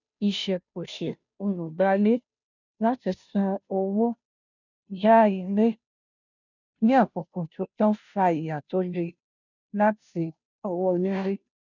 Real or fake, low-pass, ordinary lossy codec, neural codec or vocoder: fake; 7.2 kHz; none; codec, 16 kHz, 0.5 kbps, FunCodec, trained on Chinese and English, 25 frames a second